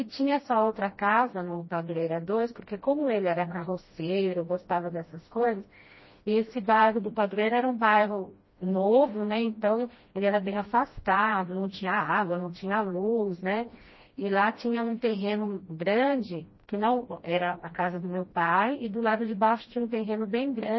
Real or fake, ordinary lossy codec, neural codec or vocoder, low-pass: fake; MP3, 24 kbps; codec, 16 kHz, 1 kbps, FreqCodec, smaller model; 7.2 kHz